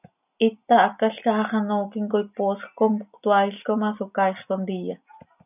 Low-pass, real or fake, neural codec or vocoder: 3.6 kHz; real; none